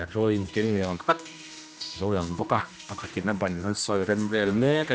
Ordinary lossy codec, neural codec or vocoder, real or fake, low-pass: none; codec, 16 kHz, 1 kbps, X-Codec, HuBERT features, trained on general audio; fake; none